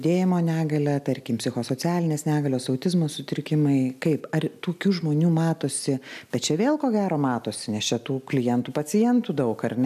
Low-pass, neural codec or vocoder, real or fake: 14.4 kHz; none; real